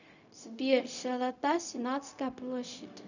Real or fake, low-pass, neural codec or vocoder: fake; 7.2 kHz; codec, 16 kHz, 0.4 kbps, LongCat-Audio-Codec